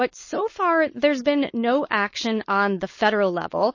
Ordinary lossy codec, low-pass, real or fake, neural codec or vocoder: MP3, 32 kbps; 7.2 kHz; fake; codec, 16 kHz, 4.8 kbps, FACodec